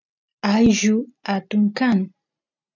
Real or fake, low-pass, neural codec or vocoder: real; 7.2 kHz; none